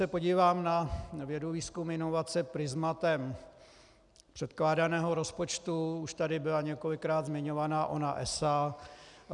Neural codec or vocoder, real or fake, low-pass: none; real; 10.8 kHz